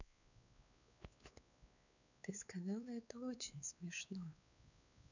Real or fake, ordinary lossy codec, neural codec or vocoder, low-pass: fake; none; codec, 16 kHz, 4 kbps, X-Codec, WavLM features, trained on Multilingual LibriSpeech; 7.2 kHz